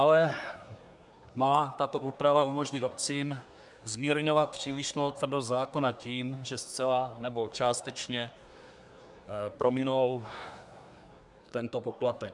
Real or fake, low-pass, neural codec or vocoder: fake; 10.8 kHz; codec, 24 kHz, 1 kbps, SNAC